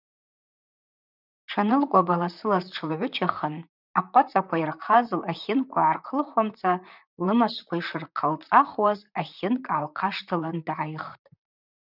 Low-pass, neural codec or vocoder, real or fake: 5.4 kHz; vocoder, 44.1 kHz, 128 mel bands, Pupu-Vocoder; fake